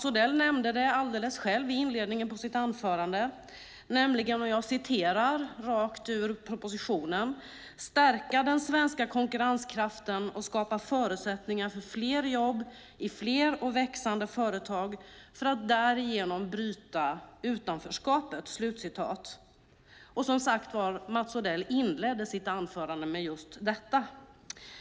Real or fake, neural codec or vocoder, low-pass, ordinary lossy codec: real; none; none; none